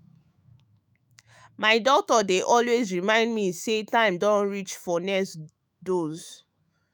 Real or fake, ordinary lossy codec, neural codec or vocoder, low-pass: fake; none; autoencoder, 48 kHz, 128 numbers a frame, DAC-VAE, trained on Japanese speech; none